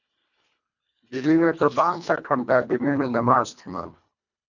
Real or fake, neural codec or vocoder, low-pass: fake; codec, 24 kHz, 1.5 kbps, HILCodec; 7.2 kHz